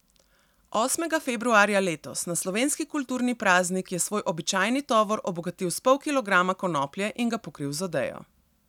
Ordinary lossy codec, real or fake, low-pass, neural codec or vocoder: none; real; 19.8 kHz; none